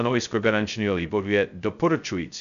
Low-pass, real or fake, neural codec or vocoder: 7.2 kHz; fake; codec, 16 kHz, 0.2 kbps, FocalCodec